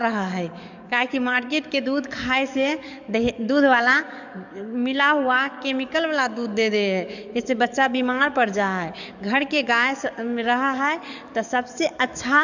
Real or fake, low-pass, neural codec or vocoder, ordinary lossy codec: fake; 7.2 kHz; codec, 44.1 kHz, 7.8 kbps, DAC; none